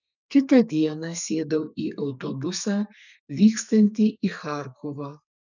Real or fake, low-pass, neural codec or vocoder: fake; 7.2 kHz; codec, 32 kHz, 1.9 kbps, SNAC